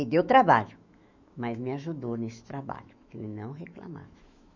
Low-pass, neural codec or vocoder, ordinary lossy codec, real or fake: 7.2 kHz; autoencoder, 48 kHz, 128 numbers a frame, DAC-VAE, trained on Japanese speech; none; fake